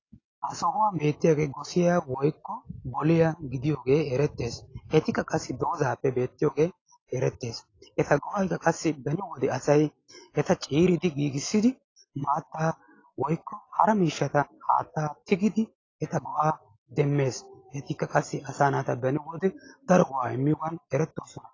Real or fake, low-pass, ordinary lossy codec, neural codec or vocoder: real; 7.2 kHz; AAC, 32 kbps; none